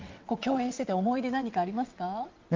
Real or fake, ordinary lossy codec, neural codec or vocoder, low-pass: fake; Opus, 32 kbps; vocoder, 22.05 kHz, 80 mel bands, WaveNeXt; 7.2 kHz